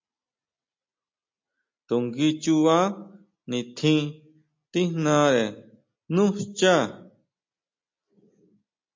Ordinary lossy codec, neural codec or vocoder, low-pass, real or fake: MP3, 48 kbps; none; 7.2 kHz; real